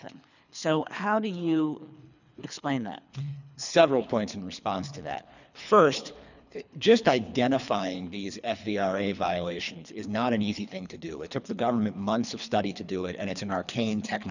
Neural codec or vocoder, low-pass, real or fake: codec, 24 kHz, 3 kbps, HILCodec; 7.2 kHz; fake